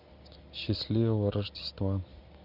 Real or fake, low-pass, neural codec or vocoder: real; 5.4 kHz; none